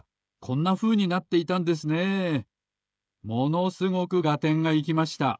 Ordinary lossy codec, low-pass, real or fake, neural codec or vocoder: none; none; fake; codec, 16 kHz, 16 kbps, FreqCodec, smaller model